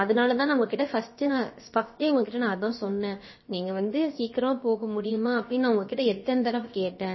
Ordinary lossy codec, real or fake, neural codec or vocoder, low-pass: MP3, 24 kbps; fake; codec, 16 kHz, about 1 kbps, DyCAST, with the encoder's durations; 7.2 kHz